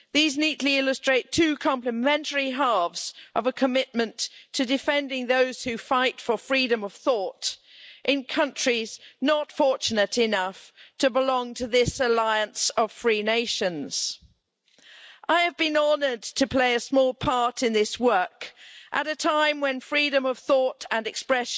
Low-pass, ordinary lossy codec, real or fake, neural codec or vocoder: none; none; real; none